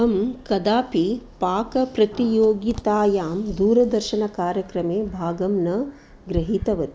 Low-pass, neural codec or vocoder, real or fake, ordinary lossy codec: none; none; real; none